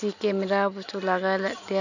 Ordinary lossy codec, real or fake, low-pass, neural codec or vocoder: none; real; 7.2 kHz; none